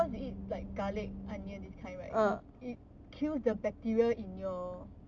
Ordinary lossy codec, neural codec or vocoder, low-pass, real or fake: MP3, 64 kbps; none; 7.2 kHz; real